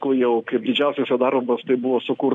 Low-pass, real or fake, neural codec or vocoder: 10.8 kHz; real; none